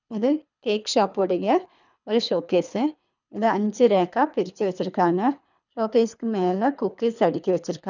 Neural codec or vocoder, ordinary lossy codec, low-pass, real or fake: codec, 24 kHz, 3 kbps, HILCodec; none; 7.2 kHz; fake